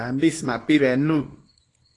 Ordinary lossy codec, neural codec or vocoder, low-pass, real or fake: AAC, 32 kbps; codec, 24 kHz, 0.9 kbps, WavTokenizer, small release; 10.8 kHz; fake